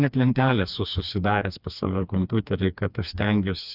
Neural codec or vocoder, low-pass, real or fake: codec, 16 kHz, 2 kbps, FreqCodec, smaller model; 5.4 kHz; fake